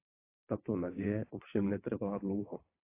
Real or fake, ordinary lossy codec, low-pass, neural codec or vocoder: fake; AAC, 24 kbps; 3.6 kHz; vocoder, 22.05 kHz, 80 mel bands, WaveNeXt